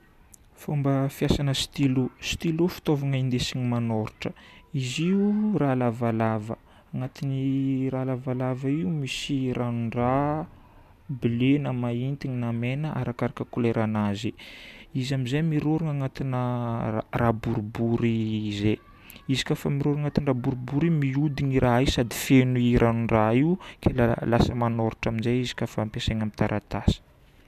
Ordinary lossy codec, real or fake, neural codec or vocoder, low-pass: none; real; none; 14.4 kHz